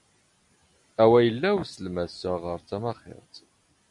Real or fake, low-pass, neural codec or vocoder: real; 10.8 kHz; none